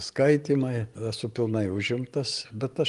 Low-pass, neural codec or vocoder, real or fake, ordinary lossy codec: 10.8 kHz; none; real; Opus, 24 kbps